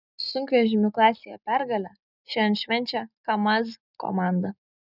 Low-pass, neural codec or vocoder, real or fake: 5.4 kHz; none; real